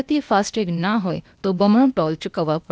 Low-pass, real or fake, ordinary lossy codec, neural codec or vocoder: none; fake; none; codec, 16 kHz, 0.8 kbps, ZipCodec